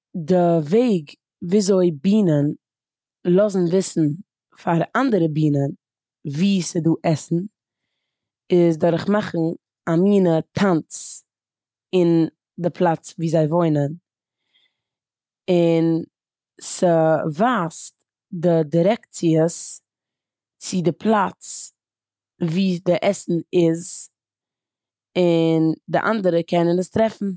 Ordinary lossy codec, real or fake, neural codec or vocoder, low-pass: none; real; none; none